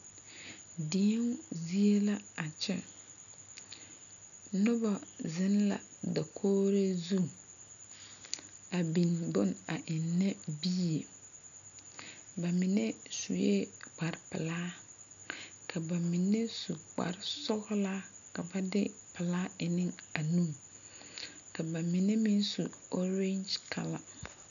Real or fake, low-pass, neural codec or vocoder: real; 7.2 kHz; none